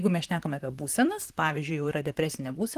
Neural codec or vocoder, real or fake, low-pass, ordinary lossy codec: vocoder, 44.1 kHz, 128 mel bands, Pupu-Vocoder; fake; 14.4 kHz; Opus, 24 kbps